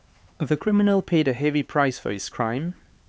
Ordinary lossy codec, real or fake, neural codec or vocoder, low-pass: none; fake; codec, 16 kHz, 4 kbps, X-Codec, HuBERT features, trained on LibriSpeech; none